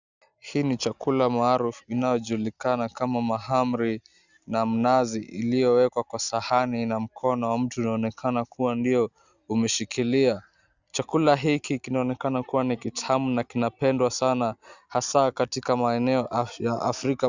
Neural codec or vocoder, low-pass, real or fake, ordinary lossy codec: none; 7.2 kHz; real; Opus, 64 kbps